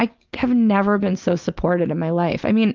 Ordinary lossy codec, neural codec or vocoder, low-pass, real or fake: Opus, 32 kbps; none; 7.2 kHz; real